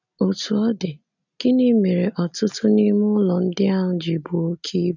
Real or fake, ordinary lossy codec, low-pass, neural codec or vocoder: real; none; 7.2 kHz; none